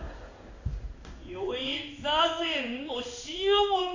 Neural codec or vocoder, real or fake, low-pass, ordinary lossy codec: codec, 16 kHz in and 24 kHz out, 1 kbps, XY-Tokenizer; fake; 7.2 kHz; AAC, 48 kbps